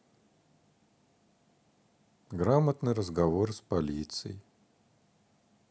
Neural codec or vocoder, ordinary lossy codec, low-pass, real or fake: none; none; none; real